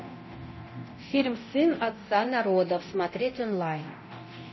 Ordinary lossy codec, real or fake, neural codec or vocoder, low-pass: MP3, 24 kbps; fake; codec, 24 kHz, 0.9 kbps, DualCodec; 7.2 kHz